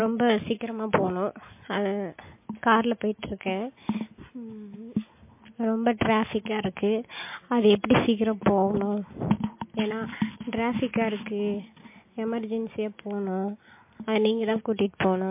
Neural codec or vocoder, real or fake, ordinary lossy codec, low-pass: none; real; MP3, 24 kbps; 3.6 kHz